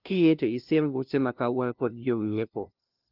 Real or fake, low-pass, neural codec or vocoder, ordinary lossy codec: fake; 5.4 kHz; codec, 16 kHz, 0.5 kbps, FunCodec, trained on LibriTTS, 25 frames a second; Opus, 32 kbps